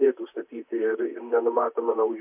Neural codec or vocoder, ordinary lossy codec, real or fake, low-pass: vocoder, 44.1 kHz, 128 mel bands, Pupu-Vocoder; AAC, 24 kbps; fake; 3.6 kHz